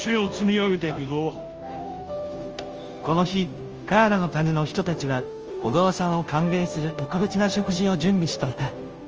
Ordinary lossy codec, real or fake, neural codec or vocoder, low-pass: Opus, 24 kbps; fake; codec, 16 kHz, 0.5 kbps, FunCodec, trained on Chinese and English, 25 frames a second; 7.2 kHz